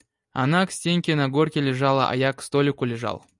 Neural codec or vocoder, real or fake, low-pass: none; real; 10.8 kHz